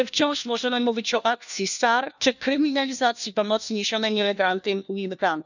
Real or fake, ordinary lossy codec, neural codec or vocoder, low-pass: fake; none; codec, 16 kHz, 1 kbps, FunCodec, trained on LibriTTS, 50 frames a second; 7.2 kHz